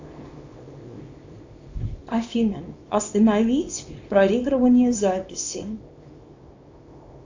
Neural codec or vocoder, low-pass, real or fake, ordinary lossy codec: codec, 24 kHz, 0.9 kbps, WavTokenizer, small release; 7.2 kHz; fake; AAC, 48 kbps